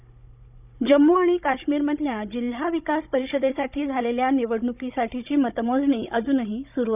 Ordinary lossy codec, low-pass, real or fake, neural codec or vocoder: none; 3.6 kHz; fake; codec, 16 kHz, 16 kbps, FunCodec, trained on Chinese and English, 50 frames a second